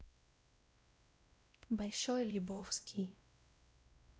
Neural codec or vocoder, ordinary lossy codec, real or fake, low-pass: codec, 16 kHz, 0.5 kbps, X-Codec, WavLM features, trained on Multilingual LibriSpeech; none; fake; none